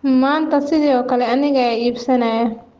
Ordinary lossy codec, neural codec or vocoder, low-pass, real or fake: Opus, 16 kbps; none; 7.2 kHz; real